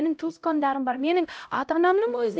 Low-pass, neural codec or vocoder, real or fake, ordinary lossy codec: none; codec, 16 kHz, 0.5 kbps, X-Codec, HuBERT features, trained on LibriSpeech; fake; none